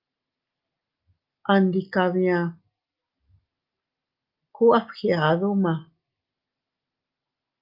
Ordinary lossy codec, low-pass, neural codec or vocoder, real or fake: Opus, 32 kbps; 5.4 kHz; none; real